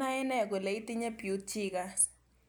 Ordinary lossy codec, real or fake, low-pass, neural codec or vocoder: none; real; none; none